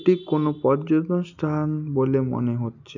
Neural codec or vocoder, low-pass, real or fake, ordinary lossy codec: none; 7.2 kHz; real; none